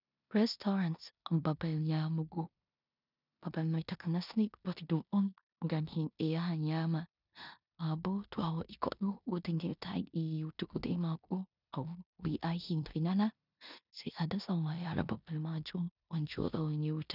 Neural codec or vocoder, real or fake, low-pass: codec, 16 kHz in and 24 kHz out, 0.9 kbps, LongCat-Audio-Codec, four codebook decoder; fake; 5.4 kHz